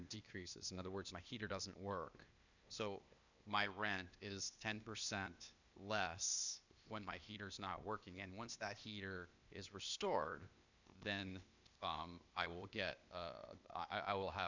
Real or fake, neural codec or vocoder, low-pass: fake; codec, 16 kHz, 0.8 kbps, ZipCodec; 7.2 kHz